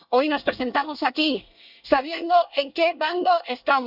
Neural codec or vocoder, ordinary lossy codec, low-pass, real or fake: codec, 24 kHz, 1 kbps, SNAC; none; 5.4 kHz; fake